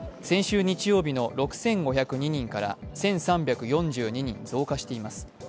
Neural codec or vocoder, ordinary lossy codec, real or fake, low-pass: none; none; real; none